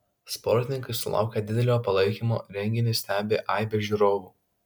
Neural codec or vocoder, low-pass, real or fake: none; 19.8 kHz; real